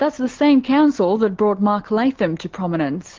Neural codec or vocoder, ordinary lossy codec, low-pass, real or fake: none; Opus, 16 kbps; 7.2 kHz; real